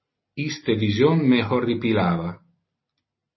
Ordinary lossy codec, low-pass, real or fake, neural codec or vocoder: MP3, 24 kbps; 7.2 kHz; real; none